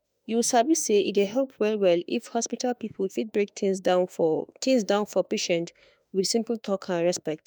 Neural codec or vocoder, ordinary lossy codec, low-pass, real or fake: autoencoder, 48 kHz, 32 numbers a frame, DAC-VAE, trained on Japanese speech; none; none; fake